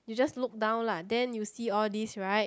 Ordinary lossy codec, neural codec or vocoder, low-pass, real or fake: none; none; none; real